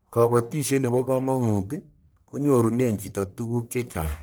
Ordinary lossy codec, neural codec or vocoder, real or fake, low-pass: none; codec, 44.1 kHz, 1.7 kbps, Pupu-Codec; fake; none